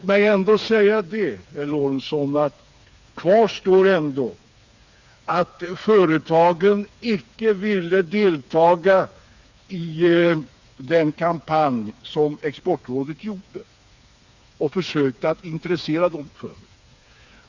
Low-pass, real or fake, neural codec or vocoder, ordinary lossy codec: 7.2 kHz; fake; codec, 16 kHz, 4 kbps, FreqCodec, smaller model; Opus, 64 kbps